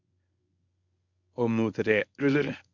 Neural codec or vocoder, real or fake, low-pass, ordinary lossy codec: codec, 24 kHz, 0.9 kbps, WavTokenizer, medium speech release version 1; fake; 7.2 kHz; none